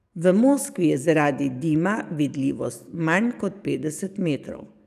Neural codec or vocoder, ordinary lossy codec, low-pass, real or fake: codec, 44.1 kHz, 7.8 kbps, DAC; none; 14.4 kHz; fake